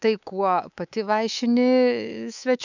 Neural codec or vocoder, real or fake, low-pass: codec, 24 kHz, 3.1 kbps, DualCodec; fake; 7.2 kHz